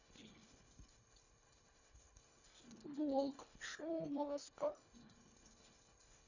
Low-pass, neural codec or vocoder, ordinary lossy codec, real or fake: 7.2 kHz; codec, 24 kHz, 1.5 kbps, HILCodec; none; fake